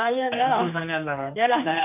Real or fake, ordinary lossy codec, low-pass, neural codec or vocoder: fake; none; 3.6 kHz; codec, 16 kHz, 2 kbps, X-Codec, HuBERT features, trained on general audio